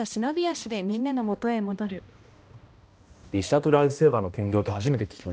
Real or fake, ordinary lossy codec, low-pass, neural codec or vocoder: fake; none; none; codec, 16 kHz, 1 kbps, X-Codec, HuBERT features, trained on balanced general audio